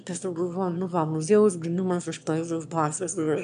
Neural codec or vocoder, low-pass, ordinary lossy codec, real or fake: autoencoder, 22.05 kHz, a latent of 192 numbers a frame, VITS, trained on one speaker; 9.9 kHz; MP3, 96 kbps; fake